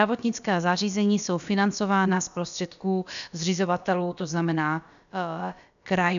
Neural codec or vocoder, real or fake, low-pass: codec, 16 kHz, about 1 kbps, DyCAST, with the encoder's durations; fake; 7.2 kHz